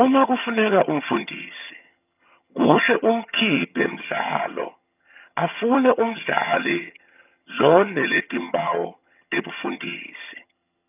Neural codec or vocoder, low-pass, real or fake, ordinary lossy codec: vocoder, 22.05 kHz, 80 mel bands, HiFi-GAN; 3.6 kHz; fake; none